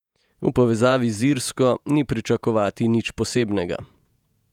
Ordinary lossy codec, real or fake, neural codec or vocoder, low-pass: none; fake; vocoder, 48 kHz, 128 mel bands, Vocos; 19.8 kHz